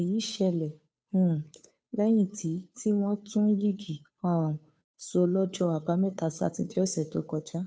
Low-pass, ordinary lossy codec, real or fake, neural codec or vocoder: none; none; fake; codec, 16 kHz, 2 kbps, FunCodec, trained on Chinese and English, 25 frames a second